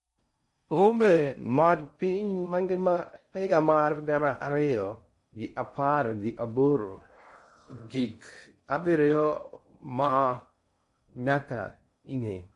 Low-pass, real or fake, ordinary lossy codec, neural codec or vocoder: 10.8 kHz; fake; MP3, 48 kbps; codec, 16 kHz in and 24 kHz out, 0.6 kbps, FocalCodec, streaming, 4096 codes